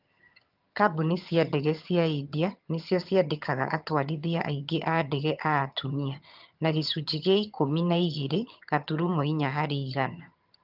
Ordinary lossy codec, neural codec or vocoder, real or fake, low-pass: Opus, 24 kbps; vocoder, 22.05 kHz, 80 mel bands, HiFi-GAN; fake; 5.4 kHz